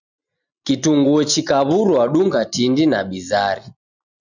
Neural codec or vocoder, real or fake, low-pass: none; real; 7.2 kHz